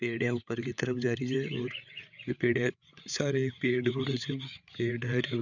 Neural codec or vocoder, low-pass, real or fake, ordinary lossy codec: codec, 16 kHz, 8 kbps, FreqCodec, larger model; 7.2 kHz; fake; none